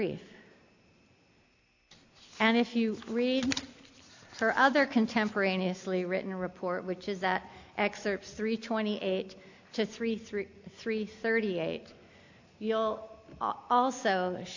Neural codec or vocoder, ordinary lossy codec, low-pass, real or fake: none; AAC, 48 kbps; 7.2 kHz; real